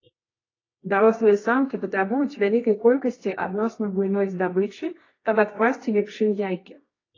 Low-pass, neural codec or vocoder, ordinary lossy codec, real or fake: 7.2 kHz; codec, 24 kHz, 0.9 kbps, WavTokenizer, medium music audio release; AAC, 32 kbps; fake